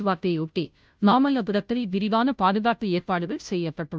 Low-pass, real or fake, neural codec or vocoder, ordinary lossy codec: none; fake; codec, 16 kHz, 0.5 kbps, FunCodec, trained on Chinese and English, 25 frames a second; none